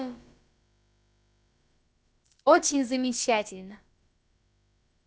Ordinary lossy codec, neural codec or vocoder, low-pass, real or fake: none; codec, 16 kHz, about 1 kbps, DyCAST, with the encoder's durations; none; fake